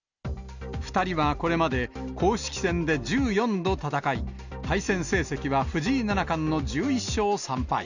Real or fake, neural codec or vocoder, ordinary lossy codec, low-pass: real; none; none; 7.2 kHz